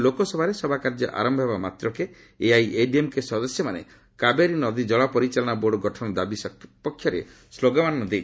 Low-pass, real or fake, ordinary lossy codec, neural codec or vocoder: none; real; none; none